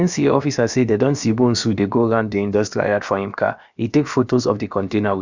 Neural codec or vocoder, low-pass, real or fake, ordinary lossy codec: codec, 16 kHz, about 1 kbps, DyCAST, with the encoder's durations; 7.2 kHz; fake; Opus, 64 kbps